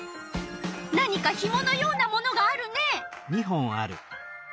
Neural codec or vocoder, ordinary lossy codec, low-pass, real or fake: none; none; none; real